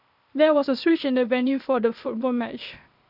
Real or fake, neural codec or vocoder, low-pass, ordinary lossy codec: fake; codec, 16 kHz, 0.8 kbps, ZipCodec; 5.4 kHz; none